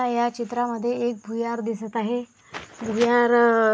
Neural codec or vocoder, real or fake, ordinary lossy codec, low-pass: none; real; none; none